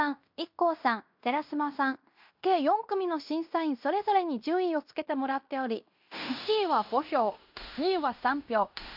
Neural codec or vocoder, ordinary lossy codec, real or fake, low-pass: codec, 16 kHz in and 24 kHz out, 0.9 kbps, LongCat-Audio-Codec, fine tuned four codebook decoder; MP3, 48 kbps; fake; 5.4 kHz